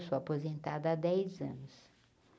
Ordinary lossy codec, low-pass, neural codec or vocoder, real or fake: none; none; none; real